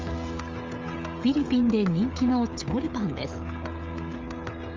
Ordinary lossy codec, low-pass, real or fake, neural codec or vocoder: Opus, 32 kbps; 7.2 kHz; fake; codec, 16 kHz, 16 kbps, FreqCodec, smaller model